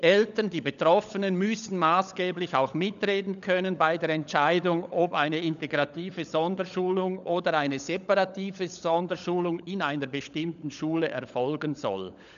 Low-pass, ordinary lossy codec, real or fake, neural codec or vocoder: 7.2 kHz; none; fake; codec, 16 kHz, 16 kbps, FunCodec, trained on LibriTTS, 50 frames a second